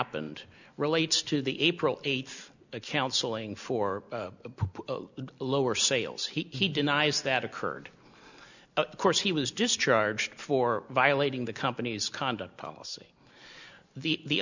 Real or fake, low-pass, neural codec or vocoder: real; 7.2 kHz; none